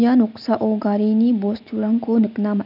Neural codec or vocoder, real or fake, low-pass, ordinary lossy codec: none; real; 5.4 kHz; none